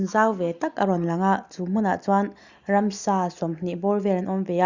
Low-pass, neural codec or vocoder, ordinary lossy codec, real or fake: 7.2 kHz; none; Opus, 64 kbps; real